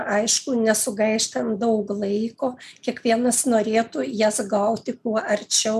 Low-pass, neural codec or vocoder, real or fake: 14.4 kHz; none; real